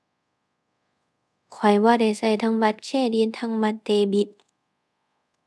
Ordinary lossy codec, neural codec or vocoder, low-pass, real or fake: none; codec, 24 kHz, 0.5 kbps, DualCodec; none; fake